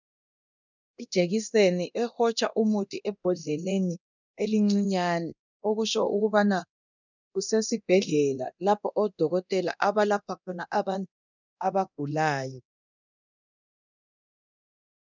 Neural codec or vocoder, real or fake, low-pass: codec, 24 kHz, 0.9 kbps, DualCodec; fake; 7.2 kHz